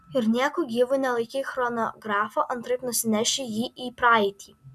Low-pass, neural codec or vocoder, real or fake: 14.4 kHz; none; real